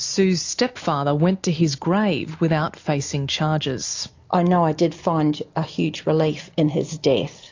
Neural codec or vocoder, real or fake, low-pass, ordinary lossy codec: none; real; 7.2 kHz; AAC, 48 kbps